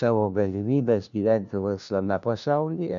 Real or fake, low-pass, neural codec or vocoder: fake; 7.2 kHz; codec, 16 kHz, 1 kbps, FunCodec, trained on LibriTTS, 50 frames a second